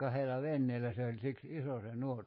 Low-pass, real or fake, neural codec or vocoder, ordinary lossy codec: 5.4 kHz; fake; codec, 24 kHz, 3.1 kbps, DualCodec; MP3, 24 kbps